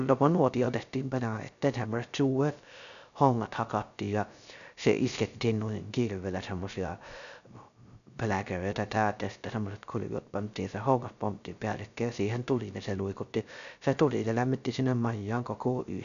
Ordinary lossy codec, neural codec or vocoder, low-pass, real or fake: none; codec, 16 kHz, 0.3 kbps, FocalCodec; 7.2 kHz; fake